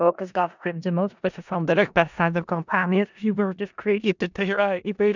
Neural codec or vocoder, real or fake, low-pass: codec, 16 kHz in and 24 kHz out, 0.4 kbps, LongCat-Audio-Codec, four codebook decoder; fake; 7.2 kHz